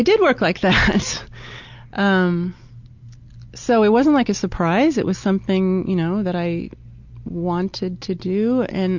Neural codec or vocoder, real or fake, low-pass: none; real; 7.2 kHz